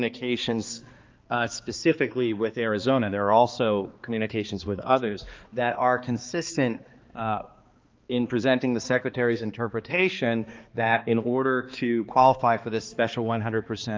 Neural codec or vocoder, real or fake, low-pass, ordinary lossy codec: codec, 16 kHz, 2 kbps, X-Codec, HuBERT features, trained on balanced general audio; fake; 7.2 kHz; Opus, 24 kbps